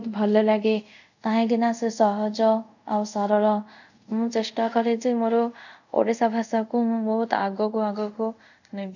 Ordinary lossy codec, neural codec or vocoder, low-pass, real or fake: none; codec, 24 kHz, 0.5 kbps, DualCodec; 7.2 kHz; fake